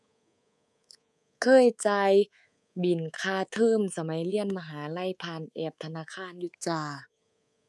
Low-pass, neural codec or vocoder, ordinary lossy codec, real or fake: none; codec, 24 kHz, 3.1 kbps, DualCodec; none; fake